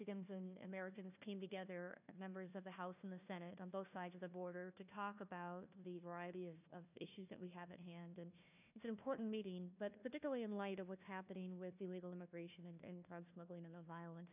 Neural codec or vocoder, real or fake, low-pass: codec, 16 kHz, 1 kbps, FunCodec, trained on Chinese and English, 50 frames a second; fake; 3.6 kHz